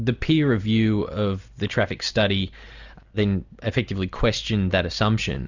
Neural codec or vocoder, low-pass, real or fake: none; 7.2 kHz; real